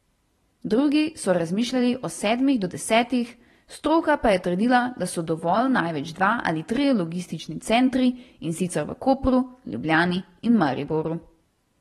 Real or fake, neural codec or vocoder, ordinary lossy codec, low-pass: fake; vocoder, 44.1 kHz, 128 mel bands every 512 samples, BigVGAN v2; AAC, 32 kbps; 19.8 kHz